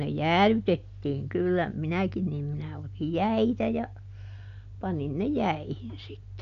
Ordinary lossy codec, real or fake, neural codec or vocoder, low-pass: none; real; none; 7.2 kHz